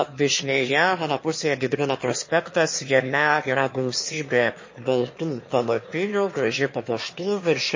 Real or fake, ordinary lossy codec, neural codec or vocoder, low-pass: fake; MP3, 32 kbps; autoencoder, 22.05 kHz, a latent of 192 numbers a frame, VITS, trained on one speaker; 7.2 kHz